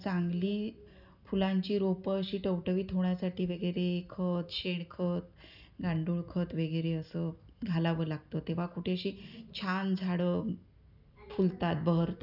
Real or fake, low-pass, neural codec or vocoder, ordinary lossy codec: real; 5.4 kHz; none; none